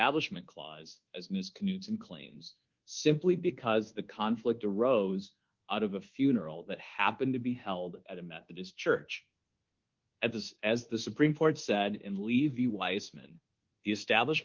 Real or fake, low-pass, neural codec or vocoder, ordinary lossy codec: fake; 7.2 kHz; codec, 24 kHz, 1.2 kbps, DualCodec; Opus, 16 kbps